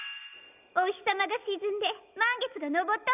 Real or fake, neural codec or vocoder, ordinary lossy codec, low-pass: real; none; none; 3.6 kHz